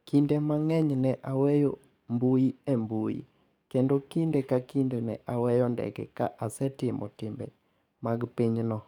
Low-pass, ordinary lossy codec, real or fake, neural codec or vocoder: 19.8 kHz; none; fake; codec, 44.1 kHz, 7.8 kbps, DAC